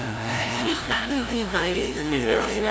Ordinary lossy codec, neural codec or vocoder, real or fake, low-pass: none; codec, 16 kHz, 0.5 kbps, FunCodec, trained on LibriTTS, 25 frames a second; fake; none